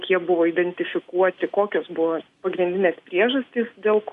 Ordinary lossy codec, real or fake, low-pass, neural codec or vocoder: Opus, 64 kbps; real; 10.8 kHz; none